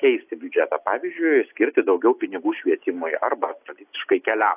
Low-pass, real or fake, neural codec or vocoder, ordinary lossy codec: 3.6 kHz; real; none; AAC, 32 kbps